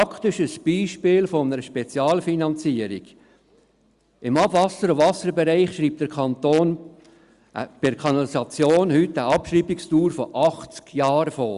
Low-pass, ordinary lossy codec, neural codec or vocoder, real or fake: 10.8 kHz; Opus, 64 kbps; none; real